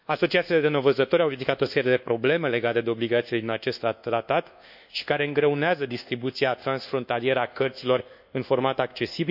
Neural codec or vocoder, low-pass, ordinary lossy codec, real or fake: codec, 24 kHz, 1.2 kbps, DualCodec; 5.4 kHz; none; fake